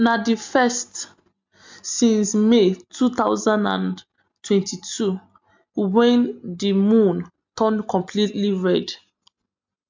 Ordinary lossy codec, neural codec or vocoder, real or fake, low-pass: MP3, 64 kbps; none; real; 7.2 kHz